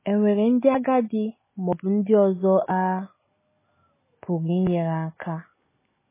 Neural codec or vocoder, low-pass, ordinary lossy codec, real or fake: none; 3.6 kHz; MP3, 16 kbps; real